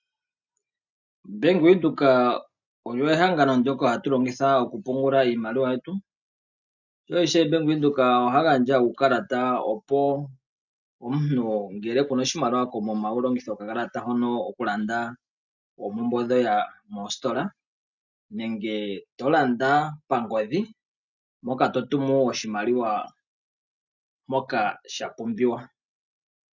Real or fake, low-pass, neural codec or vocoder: real; 7.2 kHz; none